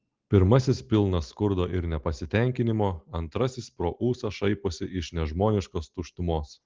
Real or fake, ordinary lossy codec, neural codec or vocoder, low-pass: real; Opus, 16 kbps; none; 7.2 kHz